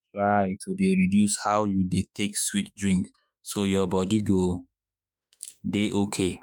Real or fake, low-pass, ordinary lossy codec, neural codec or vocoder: fake; 19.8 kHz; none; autoencoder, 48 kHz, 32 numbers a frame, DAC-VAE, trained on Japanese speech